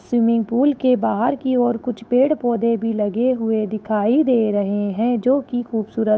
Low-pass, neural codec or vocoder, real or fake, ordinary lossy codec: none; codec, 16 kHz, 8 kbps, FunCodec, trained on Chinese and English, 25 frames a second; fake; none